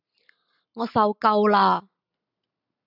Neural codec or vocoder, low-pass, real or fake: none; 5.4 kHz; real